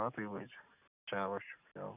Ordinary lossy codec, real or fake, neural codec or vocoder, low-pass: none; real; none; 3.6 kHz